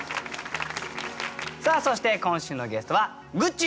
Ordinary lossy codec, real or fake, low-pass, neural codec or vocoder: none; real; none; none